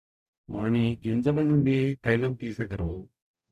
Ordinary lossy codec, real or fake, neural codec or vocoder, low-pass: none; fake; codec, 44.1 kHz, 0.9 kbps, DAC; 14.4 kHz